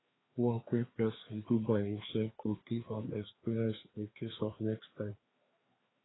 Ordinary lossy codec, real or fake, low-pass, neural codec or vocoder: AAC, 16 kbps; fake; 7.2 kHz; codec, 16 kHz, 2 kbps, FreqCodec, larger model